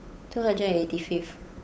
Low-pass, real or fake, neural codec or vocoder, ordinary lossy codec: none; fake; codec, 16 kHz, 8 kbps, FunCodec, trained on Chinese and English, 25 frames a second; none